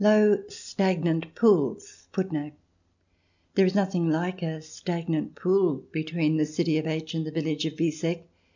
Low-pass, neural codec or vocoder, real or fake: 7.2 kHz; none; real